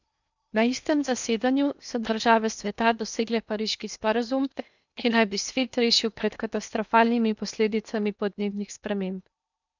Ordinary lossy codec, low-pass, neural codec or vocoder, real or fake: none; 7.2 kHz; codec, 16 kHz in and 24 kHz out, 0.8 kbps, FocalCodec, streaming, 65536 codes; fake